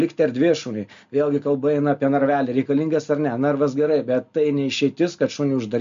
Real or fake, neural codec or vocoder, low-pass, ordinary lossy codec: real; none; 7.2 kHz; MP3, 64 kbps